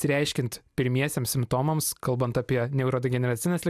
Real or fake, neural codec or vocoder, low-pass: real; none; 14.4 kHz